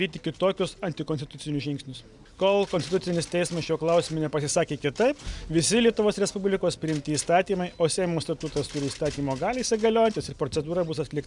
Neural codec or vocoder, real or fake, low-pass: none; real; 10.8 kHz